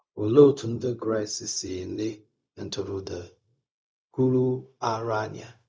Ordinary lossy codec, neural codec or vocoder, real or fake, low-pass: none; codec, 16 kHz, 0.4 kbps, LongCat-Audio-Codec; fake; none